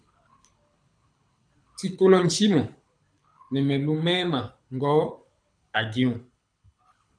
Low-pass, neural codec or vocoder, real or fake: 9.9 kHz; codec, 24 kHz, 6 kbps, HILCodec; fake